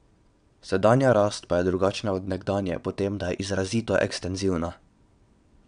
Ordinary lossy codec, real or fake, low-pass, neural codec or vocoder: none; fake; 9.9 kHz; vocoder, 22.05 kHz, 80 mel bands, WaveNeXt